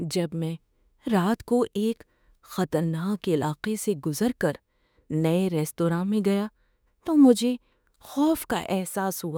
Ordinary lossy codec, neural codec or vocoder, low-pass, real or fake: none; autoencoder, 48 kHz, 32 numbers a frame, DAC-VAE, trained on Japanese speech; none; fake